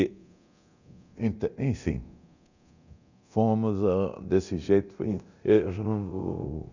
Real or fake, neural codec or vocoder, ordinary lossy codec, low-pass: fake; codec, 24 kHz, 0.9 kbps, DualCodec; none; 7.2 kHz